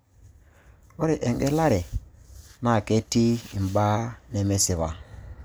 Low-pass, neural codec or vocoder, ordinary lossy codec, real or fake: none; none; none; real